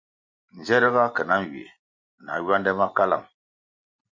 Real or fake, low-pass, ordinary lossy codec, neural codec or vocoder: real; 7.2 kHz; MP3, 48 kbps; none